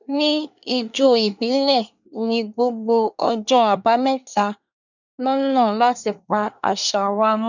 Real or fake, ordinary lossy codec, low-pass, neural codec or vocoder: fake; none; 7.2 kHz; codec, 24 kHz, 1 kbps, SNAC